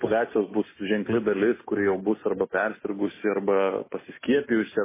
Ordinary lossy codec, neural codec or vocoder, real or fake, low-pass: MP3, 16 kbps; none; real; 3.6 kHz